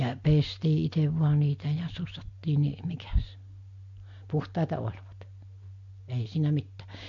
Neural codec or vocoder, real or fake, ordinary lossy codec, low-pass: none; real; MP3, 48 kbps; 7.2 kHz